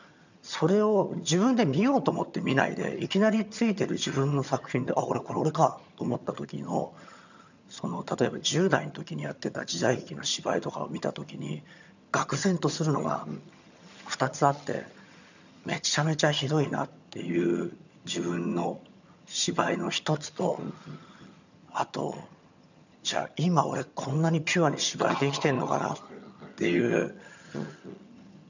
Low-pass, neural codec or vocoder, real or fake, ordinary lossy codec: 7.2 kHz; vocoder, 22.05 kHz, 80 mel bands, HiFi-GAN; fake; none